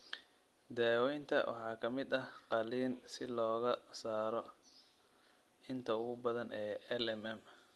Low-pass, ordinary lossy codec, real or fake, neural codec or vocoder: 19.8 kHz; Opus, 24 kbps; real; none